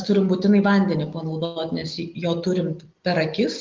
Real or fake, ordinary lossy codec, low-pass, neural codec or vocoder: real; Opus, 24 kbps; 7.2 kHz; none